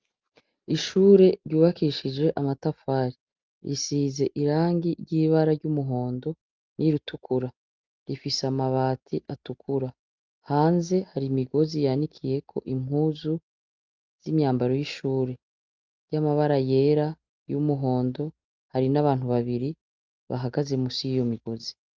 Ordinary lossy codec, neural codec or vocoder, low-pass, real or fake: Opus, 32 kbps; none; 7.2 kHz; real